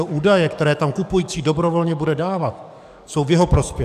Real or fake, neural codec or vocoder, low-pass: fake; codec, 44.1 kHz, 7.8 kbps, DAC; 14.4 kHz